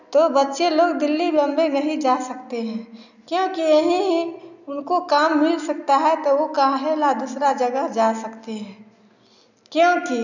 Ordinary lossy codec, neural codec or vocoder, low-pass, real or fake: none; none; 7.2 kHz; real